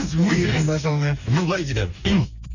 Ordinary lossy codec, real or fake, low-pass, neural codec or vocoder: none; fake; 7.2 kHz; codec, 44.1 kHz, 2.6 kbps, DAC